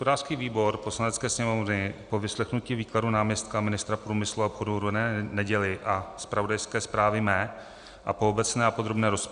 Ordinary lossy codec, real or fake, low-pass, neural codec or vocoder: Opus, 64 kbps; real; 9.9 kHz; none